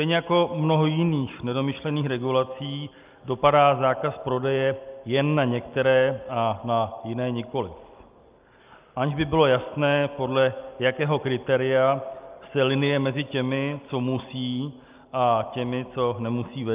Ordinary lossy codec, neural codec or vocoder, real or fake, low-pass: Opus, 24 kbps; none; real; 3.6 kHz